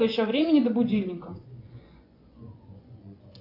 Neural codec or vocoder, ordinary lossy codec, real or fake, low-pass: vocoder, 24 kHz, 100 mel bands, Vocos; AAC, 32 kbps; fake; 5.4 kHz